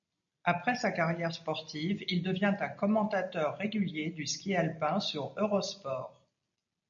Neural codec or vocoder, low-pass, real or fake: none; 7.2 kHz; real